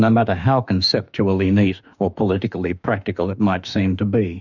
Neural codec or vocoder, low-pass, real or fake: autoencoder, 48 kHz, 32 numbers a frame, DAC-VAE, trained on Japanese speech; 7.2 kHz; fake